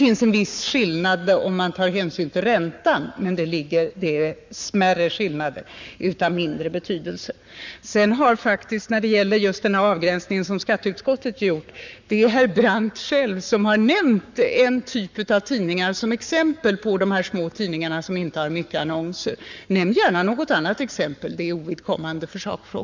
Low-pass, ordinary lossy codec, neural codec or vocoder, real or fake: 7.2 kHz; none; codec, 44.1 kHz, 7.8 kbps, Pupu-Codec; fake